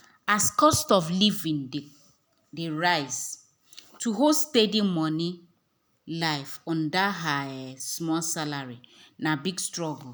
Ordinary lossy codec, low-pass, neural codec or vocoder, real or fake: none; none; none; real